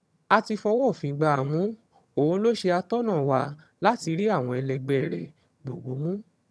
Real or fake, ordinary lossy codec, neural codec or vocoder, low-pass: fake; none; vocoder, 22.05 kHz, 80 mel bands, HiFi-GAN; none